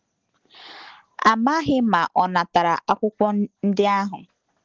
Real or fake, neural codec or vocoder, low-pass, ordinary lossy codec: real; none; 7.2 kHz; Opus, 16 kbps